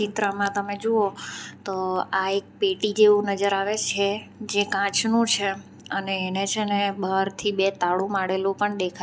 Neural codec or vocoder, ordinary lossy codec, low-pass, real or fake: none; none; none; real